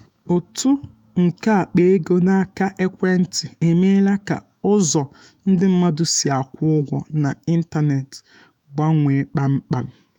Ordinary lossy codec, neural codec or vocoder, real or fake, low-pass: none; autoencoder, 48 kHz, 128 numbers a frame, DAC-VAE, trained on Japanese speech; fake; 19.8 kHz